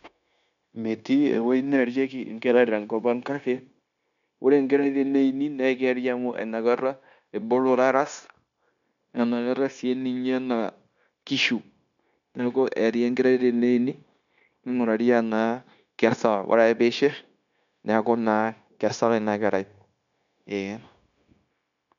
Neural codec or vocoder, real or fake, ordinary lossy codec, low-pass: codec, 16 kHz, 0.9 kbps, LongCat-Audio-Codec; fake; none; 7.2 kHz